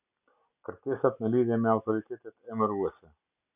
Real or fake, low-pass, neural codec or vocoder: real; 3.6 kHz; none